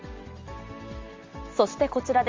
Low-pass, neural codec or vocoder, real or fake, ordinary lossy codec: 7.2 kHz; none; real; Opus, 32 kbps